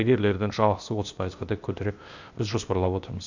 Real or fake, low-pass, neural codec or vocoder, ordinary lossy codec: fake; 7.2 kHz; codec, 16 kHz, 0.8 kbps, ZipCodec; none